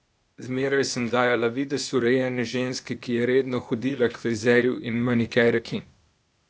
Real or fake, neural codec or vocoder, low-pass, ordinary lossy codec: fake; codec, 16 kHz, 0.8 kbps, ZipCodec; none; none